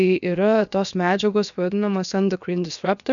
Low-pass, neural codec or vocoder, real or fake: 7.2 kHz; codec, 16 kHz, 0.7 kbps, FocalCodec; fake